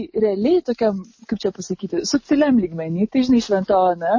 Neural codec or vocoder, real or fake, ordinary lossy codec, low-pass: none; real; MP3, 32 kbps; 7.2 kHz